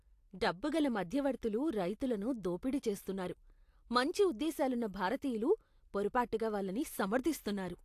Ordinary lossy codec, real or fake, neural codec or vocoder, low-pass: AAC, 64 kbps; real; none; 14.4 kHz